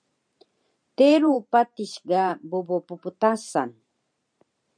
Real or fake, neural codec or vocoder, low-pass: fake; vocoder, 44.1 kHz, 128 mel bands every 512 samples, BigVGAN v2; 9.9 kHz